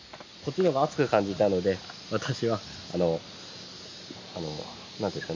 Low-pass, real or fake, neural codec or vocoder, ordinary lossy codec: 7.2 kHz; real; none; MP3, 48 kbps